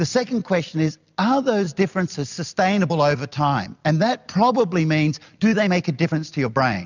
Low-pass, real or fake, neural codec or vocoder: 7.2 kHz; real; none